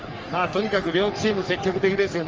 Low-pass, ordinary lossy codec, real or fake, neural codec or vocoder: 7.2 kHz; Opus, 24 kbps; fake; codec, 44.1 kHz, 3.4 kbps, Pupu-Codec